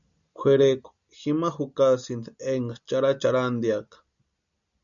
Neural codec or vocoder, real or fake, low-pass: none; real; 7.2 kHz